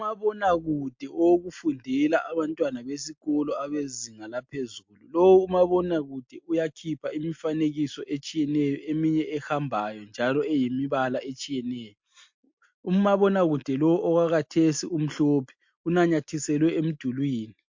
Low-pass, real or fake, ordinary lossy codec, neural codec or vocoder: 7.2 kHz; real; MP3, 48 kbps; none